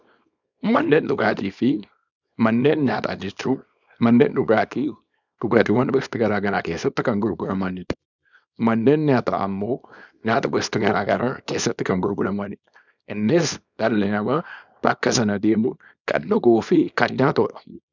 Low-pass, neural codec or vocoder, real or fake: 7.2 kHz; codec, 24 kHz, 0.9 kbps, WavTokenizer, small release; fake